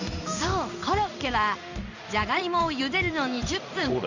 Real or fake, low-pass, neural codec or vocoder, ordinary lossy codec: fake; 7.2 kHz; codec, 16 kHz in and 24 kHz out, 1 kbps, XY-Tokenizer; none